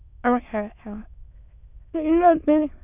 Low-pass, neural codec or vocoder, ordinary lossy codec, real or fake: 3.6 kHz; autoencoder, 22.05 kHz, a latent of 192 numbers a frame, VITS, trained on many speakers; none; fake